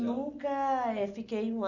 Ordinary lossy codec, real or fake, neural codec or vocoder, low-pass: none; real; none; 7.2 kHz